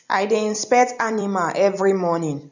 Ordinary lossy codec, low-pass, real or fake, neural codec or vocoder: none; 7.2 kHz; fake; vocoder, 44.1 kHz, 128 mel bands every 256 samples, BigVGAN v2